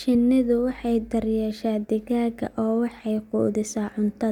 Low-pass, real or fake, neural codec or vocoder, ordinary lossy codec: 19.8 kHz; real; none; none